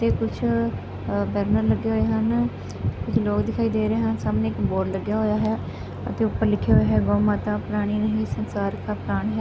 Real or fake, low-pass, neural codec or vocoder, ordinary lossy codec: real; none; none; none